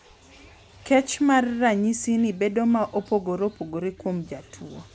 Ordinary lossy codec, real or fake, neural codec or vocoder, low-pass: none; real; none; none